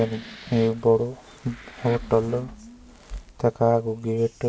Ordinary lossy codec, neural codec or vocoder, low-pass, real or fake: none; none; none; real